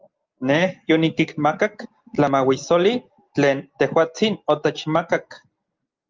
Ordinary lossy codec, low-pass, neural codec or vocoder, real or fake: Opus, 32 kbps; 7.2 kHz; none; real